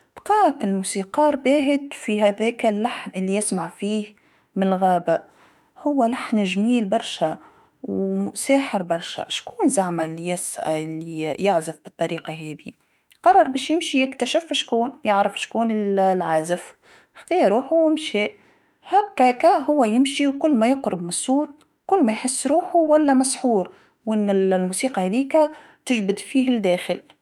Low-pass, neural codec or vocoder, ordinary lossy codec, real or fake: 19.8 kHz; autoencoder, 48 kHz, 32 numbers a frame, DAC-VAE, trained on Japanese speech; none; fake